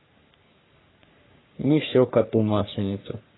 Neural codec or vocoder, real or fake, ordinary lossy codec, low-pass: codec, 44.1 kHz, 3.4 kbps, Pupu-Codec; fake; AAC, 16 kbps; 7.2 kHz